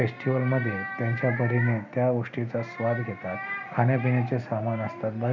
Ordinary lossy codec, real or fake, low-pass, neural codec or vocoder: none; real; 7.2 kHz; none